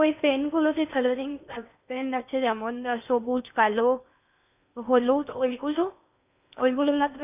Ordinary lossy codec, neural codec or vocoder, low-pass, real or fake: none; codec, 16 kHz in and 24 kHz out, 0.8 kbps, FocalCodec, streaming, 65536 codes; 3.6 kHz; fake